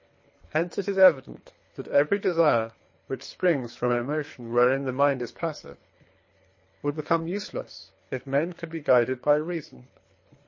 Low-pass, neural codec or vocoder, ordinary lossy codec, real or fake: 7.2 kHz; codec, 24 kHz, 3 kbps, HILCodec; MP3, 32 kbps; fake